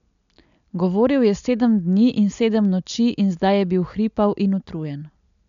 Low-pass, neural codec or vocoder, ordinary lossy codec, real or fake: 7.2 kHz; none; none; real